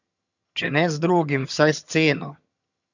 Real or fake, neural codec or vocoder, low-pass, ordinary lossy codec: fake; vocoder, 22.05 kHz, 80 mel bands, HiFi-GAN; 7.2 kHz; none